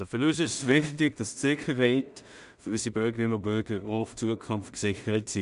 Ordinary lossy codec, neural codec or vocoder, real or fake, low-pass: none; codec, 16 kHz in and 24 kHz out, 0.4 kbps, LongCat-Audio-Codec, two codebook decoder; fake; 10.8 kHz